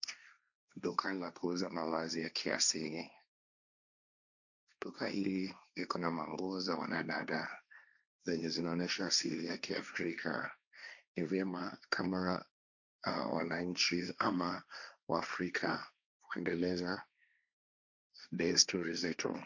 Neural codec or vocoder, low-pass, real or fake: codec, 16 kHz, 1.1 kbps, Voila-Tokenizer; 7.2 kHz; fake